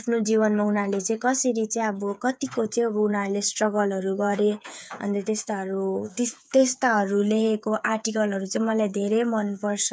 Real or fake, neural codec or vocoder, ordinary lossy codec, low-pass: fake; codec, 16 kHz, 8 kbps, FreqCodec, smaller model; none; none